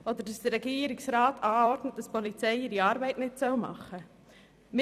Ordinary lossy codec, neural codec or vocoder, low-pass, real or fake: none; vocoder, 44.1 kHz, 128 mel bands every 256 samples, BigVGAN v2; 14.4 kHz; fake